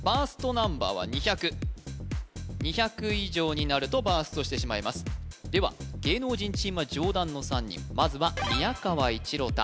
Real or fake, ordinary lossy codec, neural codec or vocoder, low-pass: real; none; none; none